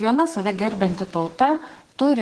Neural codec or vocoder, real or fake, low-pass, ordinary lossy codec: codec, 32 kHz, 1.9 kbps, SNAC; fake; 10.8 kHz; Opus, 16 kbps